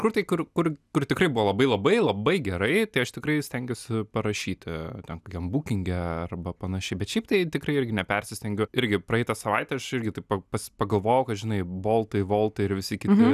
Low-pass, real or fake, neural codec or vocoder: 14.4 kHz; real; none